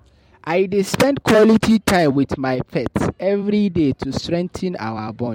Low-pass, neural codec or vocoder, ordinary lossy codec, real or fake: 19.8 kHz; vocoder, 44.1 kHz, 128 mel bands every 256 samples, BigVGAN v2; MP3, 64 kbps; fake